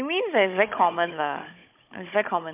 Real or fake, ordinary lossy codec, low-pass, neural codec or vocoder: fake; MP3, 32 kbps; 3.6 kHz; codec, 16 kHz, 16 kbps, FunCodec, trained on Chinese and English, 50 frames a second